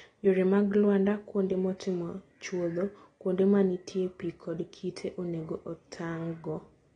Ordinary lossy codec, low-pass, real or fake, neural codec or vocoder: AAC, 32 kbps; 9.9 kHz; real; none